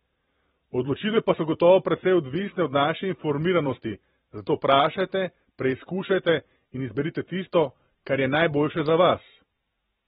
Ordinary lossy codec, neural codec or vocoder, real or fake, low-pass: AAC, 16 kbps; vocoder, 44.1 kHz, 128 mel bands every 512 samples, BigVGAN v2; fake; 19.8 kHz